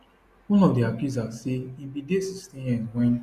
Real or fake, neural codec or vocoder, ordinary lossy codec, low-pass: real; none; Opus, 64 kbps; 14.4 kHz